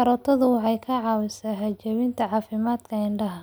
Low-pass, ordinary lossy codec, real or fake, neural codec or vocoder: none; none; real; none